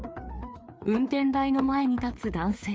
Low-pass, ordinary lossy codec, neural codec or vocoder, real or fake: none; none; codec, 16 kHz, 4 kbps, FreqCodec, larger model; fake